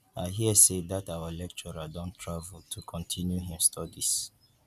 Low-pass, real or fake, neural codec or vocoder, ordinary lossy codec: 14.4 kHz; real; none; none